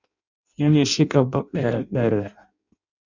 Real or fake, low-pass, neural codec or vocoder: fake; 7.2 kHz; codec, 16 kHz in and 24 kHz out, 0.6 kbps, FireRedTTS-2 codec